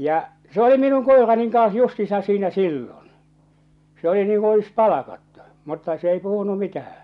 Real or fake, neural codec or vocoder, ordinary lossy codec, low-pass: real; none; none; 10.8 kHz